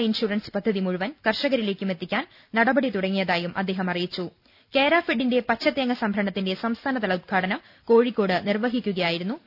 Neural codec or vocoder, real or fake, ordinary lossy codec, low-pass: none; real; none; 5.4 kHz